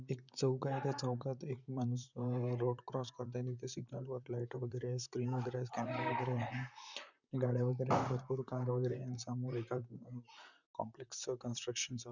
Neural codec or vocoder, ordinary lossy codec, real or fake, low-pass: vocoder, 44.1 kHz, 128 mel bands, Pupu-Vocoder; none; fake; 7.2 kHz